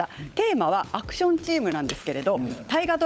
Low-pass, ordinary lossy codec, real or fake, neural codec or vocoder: none; none; fake; codec, 16 kHz, 16 kbps, FunCodec, trained on LibriTTS, 50 frames a second